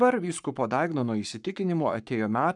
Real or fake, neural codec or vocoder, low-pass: fake; codec, 44.1 kHz, 7.8 kbps, Pupu-Codec; 10.8 kHz